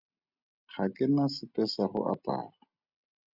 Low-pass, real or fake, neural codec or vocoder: 5.4 kHz; real; none